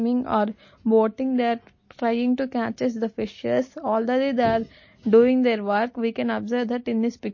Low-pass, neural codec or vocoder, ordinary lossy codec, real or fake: 7.2 kHz; none; MP3, 32 kbps; real